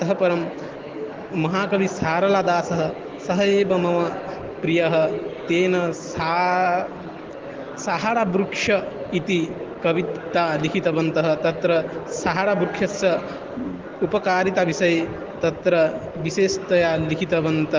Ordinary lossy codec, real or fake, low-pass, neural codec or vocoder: Opus, 16 kbps; real; 7.2 kHz; none